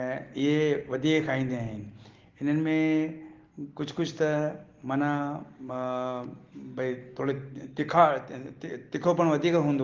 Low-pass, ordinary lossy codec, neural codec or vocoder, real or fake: 7.2 kHz; Opus, 16 kbps; none; real